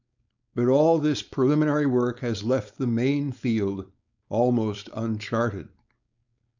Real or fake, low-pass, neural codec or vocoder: fake; 7.2 kHz; codec, 16 kHz, 4.8 kbps, FACodec